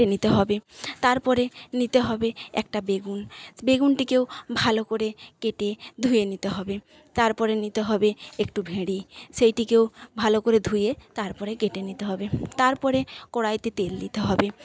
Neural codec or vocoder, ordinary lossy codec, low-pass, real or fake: none; none; none; real